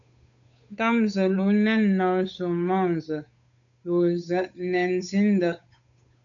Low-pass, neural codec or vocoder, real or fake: 7.2 kHz; codec, 16 kHz, 8 kbps, FunCodec, trained on Chinese and English, 25 frames a second; fake